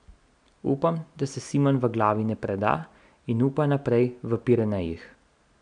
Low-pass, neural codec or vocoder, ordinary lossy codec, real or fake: 9.9 kHz; none; none; real